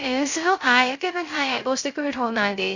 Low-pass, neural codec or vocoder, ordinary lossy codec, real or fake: 7.2 kHz; codec, 16 kHz, 0.3 kbps, FocalCodec; Opus, 64 kbps; fake